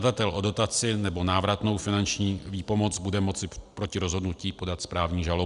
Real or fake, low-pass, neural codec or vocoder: real; 10.8 kHz; none